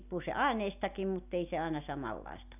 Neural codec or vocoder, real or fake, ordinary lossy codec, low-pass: none; real; none; 3.6 kHz